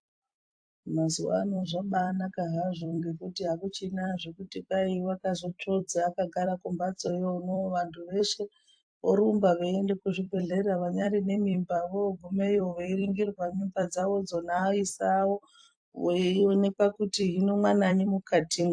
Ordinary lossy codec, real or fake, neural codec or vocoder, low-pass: AAC, 64 kbps; fake; vocoder, 44.1 kHz, 128 mel bands every 256 samples, BigVGAN v2; 9.9 kHz